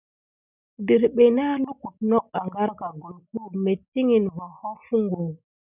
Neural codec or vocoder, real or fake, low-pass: none; real; 3.6 kHz